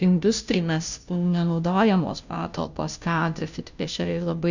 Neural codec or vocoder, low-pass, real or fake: codec, 16 kHz, 0.5 kbps, FunCodec, trained on Chinese and English, 25 frames a second; 7.2 kHz; fake